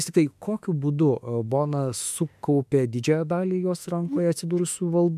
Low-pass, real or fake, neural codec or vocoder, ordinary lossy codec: 14.4 kHz; fake; autoencoder, 48 kHz, 32 numbers a frame, DAC-VAE, trained on Japanese speech; MP3, 96 kbps